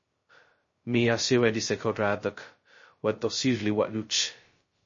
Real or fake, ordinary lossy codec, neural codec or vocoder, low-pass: fake; MP3, 32 kbps; codec, 16 kHz, 0.2 kbps, FocalCodec; 7.2 kHz